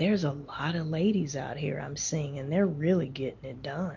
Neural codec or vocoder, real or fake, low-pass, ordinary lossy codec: none; real; 7.2 kHz; MP3, 48 kbps